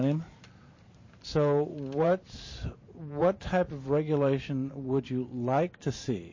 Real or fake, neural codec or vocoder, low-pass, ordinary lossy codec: fake; vocoder, 44.1 kHz, 128 mel bands every 512 samples, BigVGAN v2; 7.2 kHz; MP3, 32 kbps